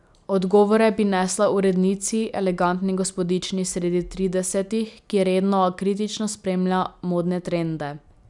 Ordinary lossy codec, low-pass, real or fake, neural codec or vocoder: none; 10.8 kHz; real; none